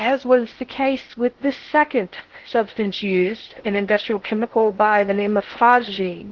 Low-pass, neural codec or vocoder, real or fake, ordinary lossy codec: 7.2 kHz; codec, 16 kHz in and 24 kHz out, 0.6 kbps, FocalCodec, streaming, 2048 codes; fake; Opus, 16 kbps